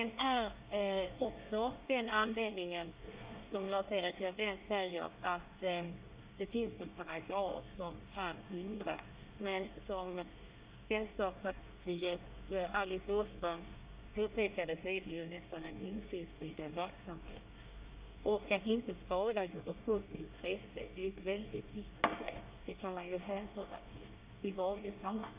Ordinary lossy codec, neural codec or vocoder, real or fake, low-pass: Opus, 64 kbps; codec, 24 kHz, 1 kbps, SNAC; fake; 3.6 kHz